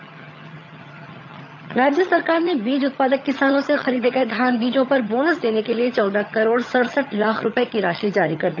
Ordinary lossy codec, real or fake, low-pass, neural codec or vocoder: none; fake; 7.2 kHz; vocoder, 22.05 kHz, 80 mel bands, HiFi-GAN